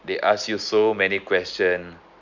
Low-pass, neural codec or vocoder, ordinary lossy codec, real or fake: 7.2 kHz; none; none; real